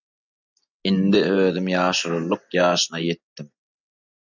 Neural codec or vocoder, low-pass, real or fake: none; 7.2 kHz; real